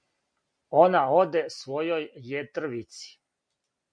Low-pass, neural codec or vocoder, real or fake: 9.9 kHz; none; real